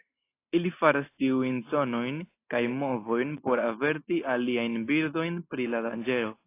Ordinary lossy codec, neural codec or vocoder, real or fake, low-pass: AAC, 24 kbps; none; real; 3.6 kHz